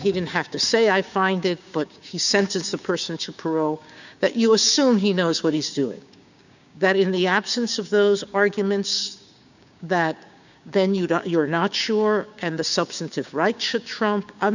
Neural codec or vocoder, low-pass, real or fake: codec, 16 kHz, 6 kbps, DAC; 7.2 kHz; fake